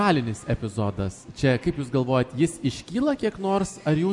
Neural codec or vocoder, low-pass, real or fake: none; 10.8 kHz; real